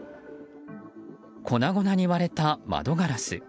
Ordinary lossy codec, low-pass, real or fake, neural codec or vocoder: none; none; real; none